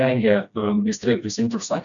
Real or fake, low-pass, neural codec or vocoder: fake; 7.2 kHz; codec, 16 kHz, 1 kbps, FreqCodec, smaller model